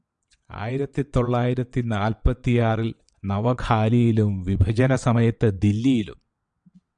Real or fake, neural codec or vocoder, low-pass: fake; vocoder, 22.05 kHz, 80 mel bands, WaveNeXt; 9.9 kHz